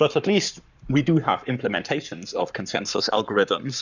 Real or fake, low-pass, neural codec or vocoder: fake; 7.2 kHz; codec, 44.1 kHz, 7.8 kbps, Pupu-Codec